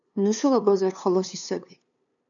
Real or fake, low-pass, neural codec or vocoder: fake; 7.2 kHz; codec, 16 kHz, 2 kbps, FunCodec, trained on LibriTTS, 25 frames a second